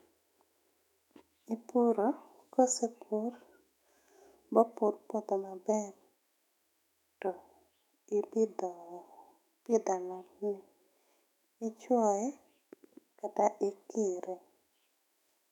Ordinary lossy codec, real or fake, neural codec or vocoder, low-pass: none; fake; autoencoder, 48 kHz, 128 numbers a frame, DAC-VAE, trained on Japanese speech; 19.8 kHz